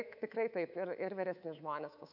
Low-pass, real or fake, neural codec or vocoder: 5.4 kHz; fake; codec, 24 kHz, 3.1 kbps, DualCodec